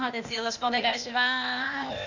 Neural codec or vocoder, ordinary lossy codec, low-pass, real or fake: codec, 16 kHz, 0.8 kbps, ZipCodec; none; 7.2 kHz; fake